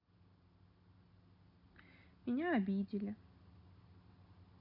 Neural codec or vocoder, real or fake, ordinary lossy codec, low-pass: none; real; none; 5.4 kHz